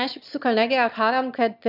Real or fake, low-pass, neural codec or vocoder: fake; 5.4 kHz; autoencoder, 22.05 kHz, a latent of 192 numbers a frame, VITS, trained on one speaker